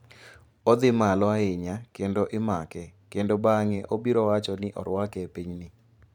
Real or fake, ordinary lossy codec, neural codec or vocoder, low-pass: fake; none; vocoder, 44.1 kHz, 128 mel bands every 512 samples, BigVGAN v2; 19.8 kHz